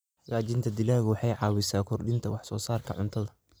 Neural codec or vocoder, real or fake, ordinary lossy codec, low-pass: none; real; none; none